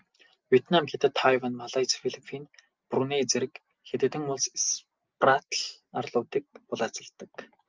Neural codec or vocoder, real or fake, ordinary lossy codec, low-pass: none; real; Opus, 24 kbps; 7.2 kHz